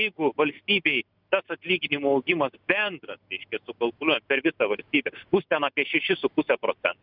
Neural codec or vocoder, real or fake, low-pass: none; real; 5.4 kHz